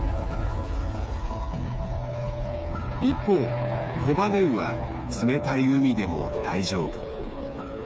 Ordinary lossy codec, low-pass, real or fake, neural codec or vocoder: none; none; fake; codec, 16 kHz, 4 kbps, FreqCodec, smaller model